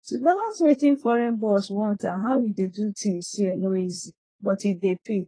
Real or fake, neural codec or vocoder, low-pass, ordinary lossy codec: fake; codec, 24 kHz, 1 kbps, SNAC; 9.9 kHz; AAC, 32 kbps